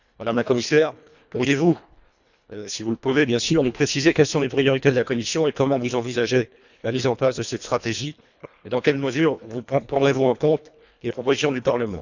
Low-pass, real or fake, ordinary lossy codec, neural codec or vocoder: 7.2 kHz; fake; none; codec, 24 kHz, 1.5 kbps, HILCodec